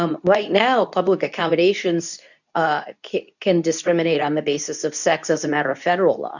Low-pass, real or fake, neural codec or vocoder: 7.2 kHz; fake; codec, 24 kHz, 0.9 kbps, WavTokenizer, medium speech release version 2